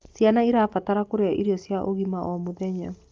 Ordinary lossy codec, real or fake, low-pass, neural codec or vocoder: Opus, 32 kbps; real; 7.2 kHz; none